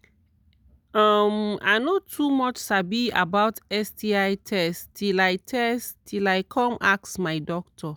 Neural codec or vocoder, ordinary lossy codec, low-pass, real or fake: none; none; none; real